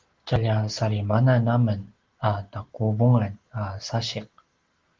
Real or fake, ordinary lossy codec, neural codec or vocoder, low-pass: real; Opus, 16 kbps; none; 7.2 kHz